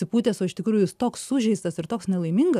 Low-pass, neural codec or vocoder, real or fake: 14.4 kHz; none; real